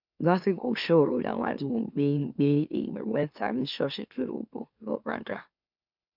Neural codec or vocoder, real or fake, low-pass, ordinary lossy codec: autoencoder, 44.1 kHz, a latent of 192 numbers a frame, MeloTTS; fake; 5.4 kHz; none